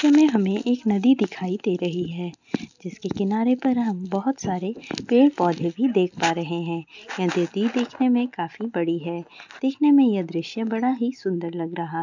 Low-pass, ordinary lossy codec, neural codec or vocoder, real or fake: 7.2 kHz; none; none; real